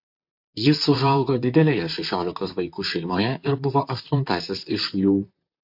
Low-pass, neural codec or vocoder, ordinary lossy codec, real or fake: 5.4 kHz; vocoder, 44.1 kHz, 128 mel bands, Pupu-Vocoder; AAC, 48 kbps; fake